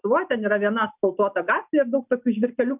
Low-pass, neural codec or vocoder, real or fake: 3.6 kHz; none; real